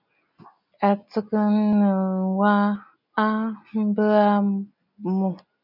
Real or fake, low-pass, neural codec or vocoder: real; 5.4 kHz; none